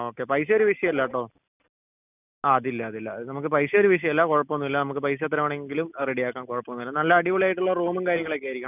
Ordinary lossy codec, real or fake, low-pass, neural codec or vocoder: none; real; 3.6 kHz; none